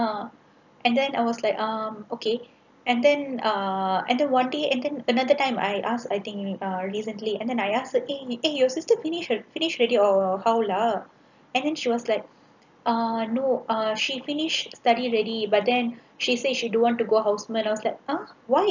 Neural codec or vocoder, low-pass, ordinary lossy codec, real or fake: none; 7.2 kHz; none; real